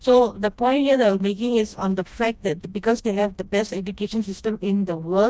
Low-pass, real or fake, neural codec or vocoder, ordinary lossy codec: none; fake; codec, 16 kHz, 1 kbps, FreqCodec, smaller model; none